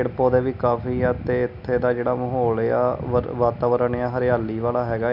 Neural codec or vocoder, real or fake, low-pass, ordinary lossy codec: none; real; 5.4 kHz; none